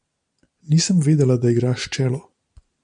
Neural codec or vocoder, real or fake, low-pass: none; real; 9.9 kHz